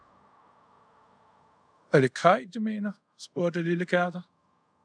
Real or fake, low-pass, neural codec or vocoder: fake; 9.9 kHz; codec, 24 kHz, 0.5 kbps, DualCodec